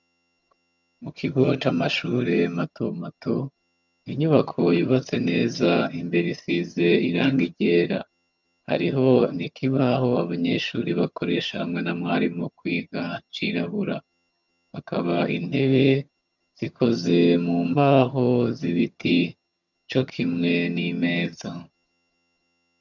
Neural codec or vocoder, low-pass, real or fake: vocoder, 22.05 kHz, 80 mel bands, HiFi-GAN; 7.2 kHz; fake